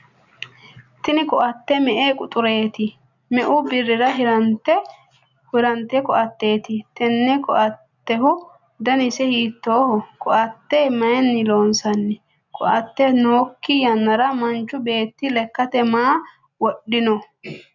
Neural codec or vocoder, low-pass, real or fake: none; 7.2 kHz; real